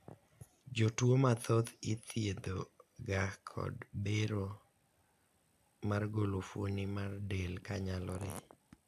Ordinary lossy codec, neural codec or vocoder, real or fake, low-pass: AAC, 96 kbps; none; real; 14.4 kHz